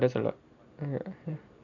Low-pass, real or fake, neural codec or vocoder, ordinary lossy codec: 7.2 kHz; real; none; none